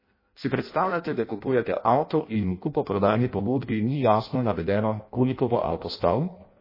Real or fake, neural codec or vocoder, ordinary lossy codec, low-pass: fake; codec, 16 kHz in and 24 kHz out, 0.6 kbps, FireRedTTS-2 codec; MP3, 24 kbps; 5.4 kHz